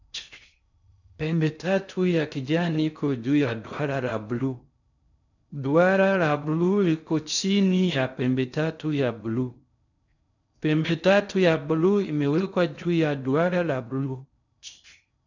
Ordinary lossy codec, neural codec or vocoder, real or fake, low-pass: none; codec, 16 kHz in and 24 kHz out, 0.6 kbps, FocalCodec, streaming, 4096 codes; fake; 7.2 kHz